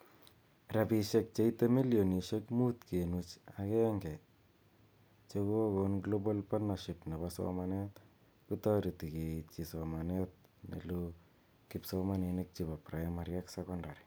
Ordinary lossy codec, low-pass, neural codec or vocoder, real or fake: none; none; none; real